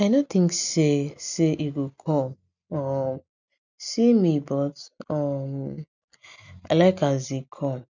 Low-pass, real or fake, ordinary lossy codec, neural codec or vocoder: 7.2 kHz; real; none; none